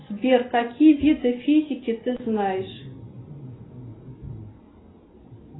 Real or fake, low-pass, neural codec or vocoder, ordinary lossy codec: real; 7.2 kHz; none; AAC, 16 kbps